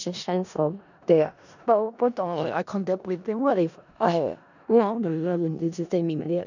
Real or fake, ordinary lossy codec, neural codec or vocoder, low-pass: fake; none; codec, 16 kHz in and 24 kHz out, 0.4 kbps, LongCat-Audio-Codec, four codebook decoder; 7.2 kHz